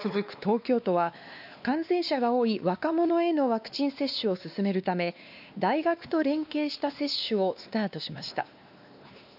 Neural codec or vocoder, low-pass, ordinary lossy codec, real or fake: codec, 16 kHz, 2 kbps, X-Codec, WavLM features, trained on Multilingual LibriSpeech; 5.4 kHz; none; fake